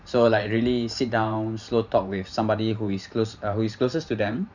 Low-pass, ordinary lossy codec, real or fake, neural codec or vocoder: 7.2 kHz; none; fake; vocoder, 44.1 kHz, 128 mel bands every 512 samples, BigVGAN v2